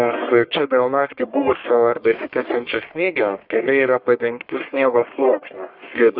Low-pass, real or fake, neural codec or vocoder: 5.4 kHz; fake; codec, 44.1 kHz, 1.7 kbps, Pupu-Codec